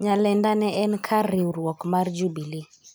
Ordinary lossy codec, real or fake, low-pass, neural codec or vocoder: none; real; none; none